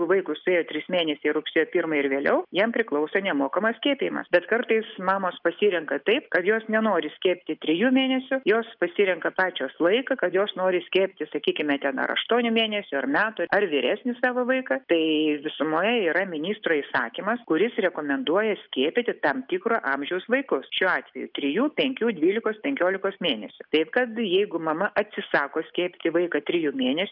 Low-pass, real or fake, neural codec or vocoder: 5.4 kHz; real; none